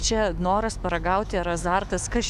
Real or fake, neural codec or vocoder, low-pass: fake; autoencoder, 48 kHz, 128 numbers a frame, DAC-VAE, trained on Japanese speech; 14.4 kHz